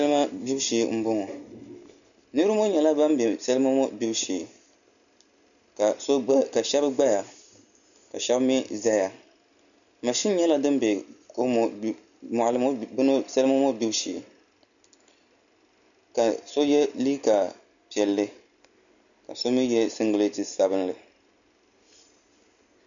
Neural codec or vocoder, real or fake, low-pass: none; real; 7.2 kHz